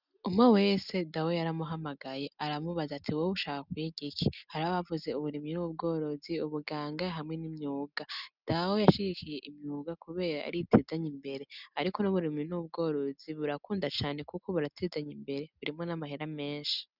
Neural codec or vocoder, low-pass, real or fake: none; 5.4 kHz; real